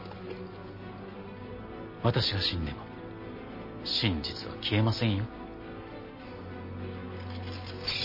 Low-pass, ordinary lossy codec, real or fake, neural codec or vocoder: 5.4 kHz; MP3, 24 kbps; real; none